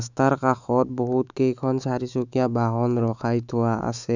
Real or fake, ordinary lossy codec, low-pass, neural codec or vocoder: fake; none; 7.2 kHz; autoencoder, 48 kHz, 128 numbers a frame, DAC-VAE, trained on Japanese speech